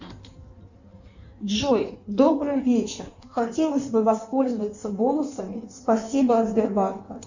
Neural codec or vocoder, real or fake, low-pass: codec, 16 kHz in and 24 kHz out, 1.1 kbps, FireRedTTS-2 codec; fake; 7.2 kHz